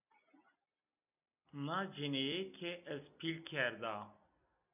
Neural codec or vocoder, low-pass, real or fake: none; 3.6 kHz; real